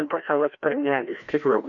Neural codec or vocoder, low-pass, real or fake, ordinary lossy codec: codec, 16 kHz, 1 kbps, FreqCodec, larger model; 7.2 kHz; fake; AAC, 64 kbps